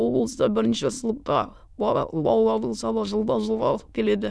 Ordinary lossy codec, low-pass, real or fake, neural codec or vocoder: none; none; fake; autoencoder, 22.05 kHz, a latent of 192 numbers a frame, VITS, trained on many speakers